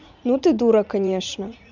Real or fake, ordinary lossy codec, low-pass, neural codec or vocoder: fake; none; 7.2 kHz; vocoder, 44.1 kHz, 80 mel bands, Vocos